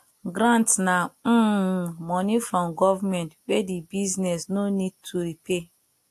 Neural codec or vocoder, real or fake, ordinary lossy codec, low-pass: none; real; AAC, 64 kbps; 14.4 kHz